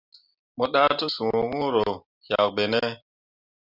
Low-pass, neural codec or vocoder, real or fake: 5.4 kHz; none; real